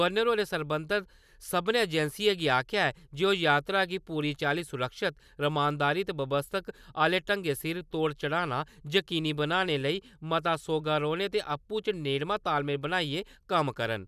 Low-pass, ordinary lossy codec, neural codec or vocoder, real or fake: 14.4 kHz; none; none; real